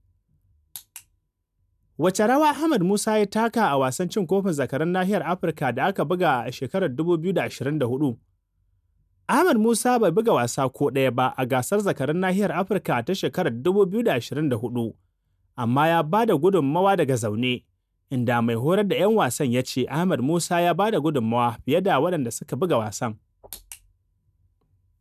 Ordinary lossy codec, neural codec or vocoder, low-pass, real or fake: none; none; 14.4 kHz; real